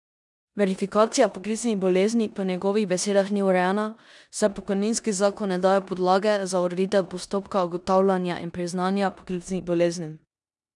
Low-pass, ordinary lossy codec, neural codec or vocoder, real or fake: 10.8 kHz; none; codec, 16 kHz in and 24 kHz out, 0.9 kbps, LongCat-Audio-Codec, four codebook decoder; fake